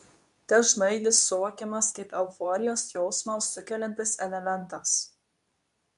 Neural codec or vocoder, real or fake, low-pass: codec, 24 kHz, 0.9 kbps, WavTokenizer, medium speech release version 2; fake; 10.8 kHz